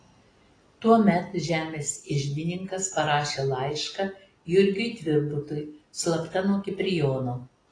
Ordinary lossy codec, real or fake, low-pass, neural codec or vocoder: AAC, 32 kbps; real; 9.9 kHz; none